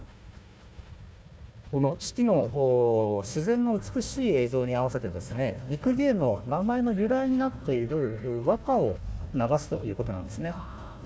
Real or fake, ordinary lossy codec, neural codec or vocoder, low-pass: fake; none; codec, 16 kHz, 1 kbps, FunCodec, trained on Chinese and English, 50 frames a second; none